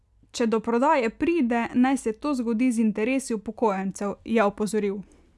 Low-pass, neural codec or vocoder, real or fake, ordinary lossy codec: none; none; real; none